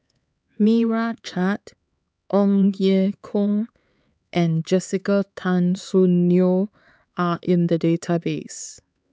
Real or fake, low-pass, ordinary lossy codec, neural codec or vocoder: fake; none; none; codec, 16 kHz, 4 kbps, X-Codec, HuBERT features, trained on LibriSpeech